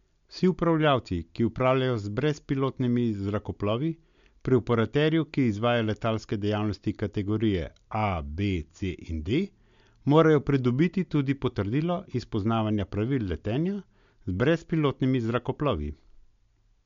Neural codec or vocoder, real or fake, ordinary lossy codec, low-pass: none; real; MP3, 64 kbps; 7.2 kHz